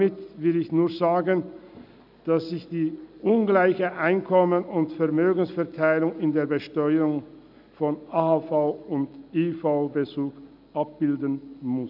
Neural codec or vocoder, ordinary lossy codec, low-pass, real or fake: none; none; 5.4 kHz; real